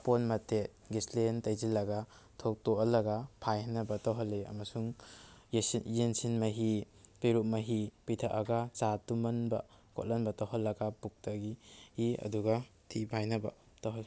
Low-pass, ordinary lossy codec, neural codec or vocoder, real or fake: none; none; none; real